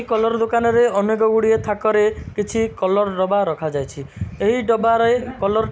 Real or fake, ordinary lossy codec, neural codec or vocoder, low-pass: real; none; none; none